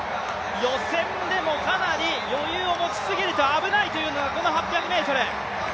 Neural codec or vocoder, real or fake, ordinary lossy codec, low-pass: none; real; none; none